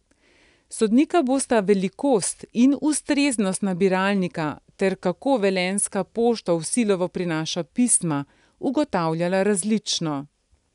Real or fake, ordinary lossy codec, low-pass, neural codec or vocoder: real; none; 10.8 kHz; none